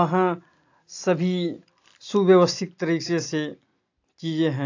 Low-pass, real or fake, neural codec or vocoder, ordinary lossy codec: 7.2 kHz; real; none; AAC, 48 kbps